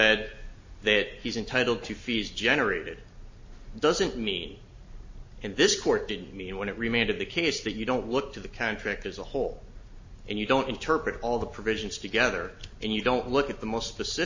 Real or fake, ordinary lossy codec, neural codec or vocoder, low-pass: real; MP3, 32 kbps; none; 7.2 kHz